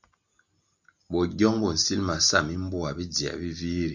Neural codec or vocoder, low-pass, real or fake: none; 7.2 kHz; real